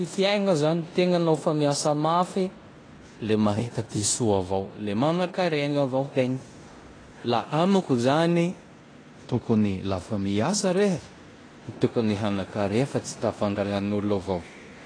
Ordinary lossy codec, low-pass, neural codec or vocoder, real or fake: AAC, 32 kbps; 9.9 kHz; codec, 16 kHz in and 24 kHz out, 0.9 kbps, LongCat-Audio-Codec, four codebook decoder; fake